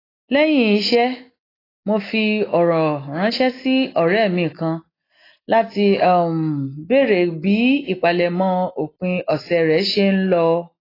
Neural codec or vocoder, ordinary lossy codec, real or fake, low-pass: none; AAC, 24 kbps; real; 5.4 kHz